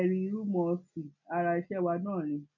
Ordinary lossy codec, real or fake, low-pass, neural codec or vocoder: none; real; 7.2 kHz; none